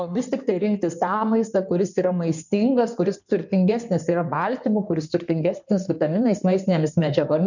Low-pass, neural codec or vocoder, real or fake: 7.2 kHz; codec, 16 kHz in and 24 kHz out, 2.2 kbps, FireRedTTS-2 codec; fake